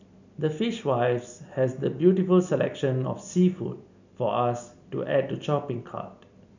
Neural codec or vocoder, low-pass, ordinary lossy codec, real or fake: none; 7.2 kHz; none; real